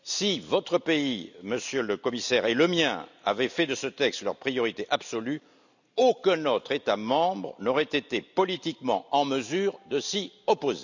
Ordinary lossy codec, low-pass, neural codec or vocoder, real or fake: none; 7.2 kHz; none; real